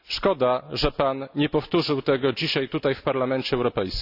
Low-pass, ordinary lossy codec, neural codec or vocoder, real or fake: 5.4 kHz; none; none; real